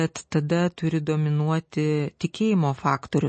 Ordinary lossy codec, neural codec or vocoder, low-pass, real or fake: MP3, 32 kbps; none; 10.8 kHz; real